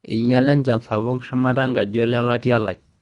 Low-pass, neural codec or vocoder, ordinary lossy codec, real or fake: 10.8 kHz; codec, 24 kHz, 1.5 kbps, HILCodec; Opus, 64 kbps; fake